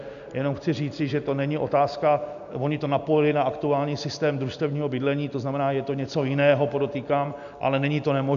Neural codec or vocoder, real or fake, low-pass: none; real; 7.2 kHz